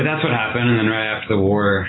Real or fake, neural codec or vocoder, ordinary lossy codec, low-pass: real; none; AAC, 16 kbps; 7.2 kHz